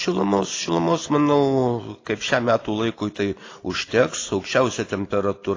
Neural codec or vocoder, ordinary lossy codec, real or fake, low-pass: none; AAC, 32 kbps; real; 7.2 kHz